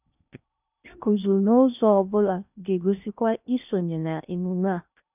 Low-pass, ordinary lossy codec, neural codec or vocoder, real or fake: 3.6 kHz; none; codec, 16 kHz in and 24 kHz out, 0.8 kbps, FocalCodec, streaming, 65536 codes; fake